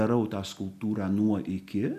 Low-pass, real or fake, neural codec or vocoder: 14.4 kHz; real; none